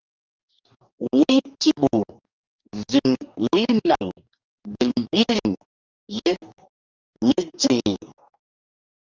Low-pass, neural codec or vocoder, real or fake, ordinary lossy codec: 7.2 kHz; codec, 44.1 kHz, 2.6 kbps, DAC; fake; Opus, 32 kbps